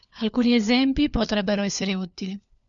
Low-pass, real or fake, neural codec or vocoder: 7.2 kHz; fake; codec, 16 kHz, 4 kbps, FunCodec, trained on LibriTTS, 50 frames a second